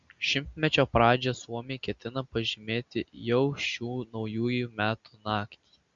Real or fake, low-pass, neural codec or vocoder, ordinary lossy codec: real; 7.2 kHz; none; Opus, 64 kbps